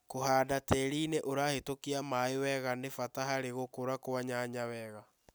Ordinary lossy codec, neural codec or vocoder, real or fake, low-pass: none; none; real; none